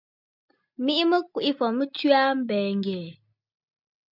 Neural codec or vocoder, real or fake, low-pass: none; real; 5.4 kHz